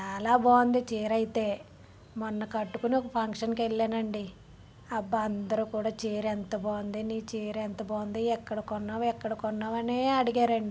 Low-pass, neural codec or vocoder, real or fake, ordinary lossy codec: none; none; real; none